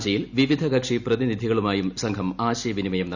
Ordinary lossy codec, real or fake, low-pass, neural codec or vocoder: none; real; 7.2 kHz; none